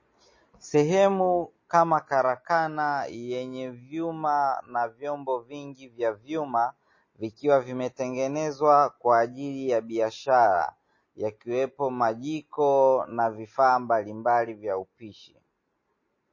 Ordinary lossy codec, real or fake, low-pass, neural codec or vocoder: MP3, 32 kbps; real; 7.2 kHz; none